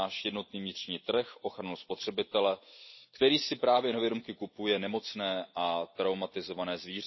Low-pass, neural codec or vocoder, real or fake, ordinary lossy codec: 7.2 kHz; none; real; MP3, 24 kbps